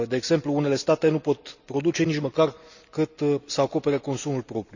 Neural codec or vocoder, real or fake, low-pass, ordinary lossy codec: none; real; 7.2 kHz; none